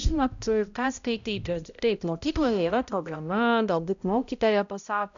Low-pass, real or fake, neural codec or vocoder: 7.2 kHz; fake; codec, 16 kHz, 0.5 kbps, X-Codec, HuBERT features, trained on balanced general audio